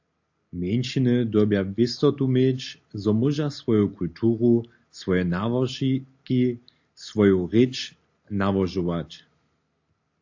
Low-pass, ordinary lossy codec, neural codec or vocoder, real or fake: 7.2 kHz; AAC, 48 kbps; none; real